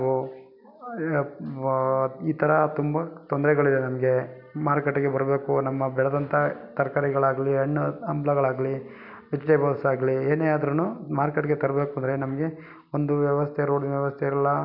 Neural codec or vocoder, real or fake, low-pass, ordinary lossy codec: none; real; 5.4 kHz; none